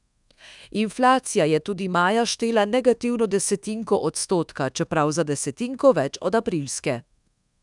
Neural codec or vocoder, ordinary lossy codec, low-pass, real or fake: codec, 24 kHz, 1.2 kbps, DualCodec; none; 10.8 kHz; fake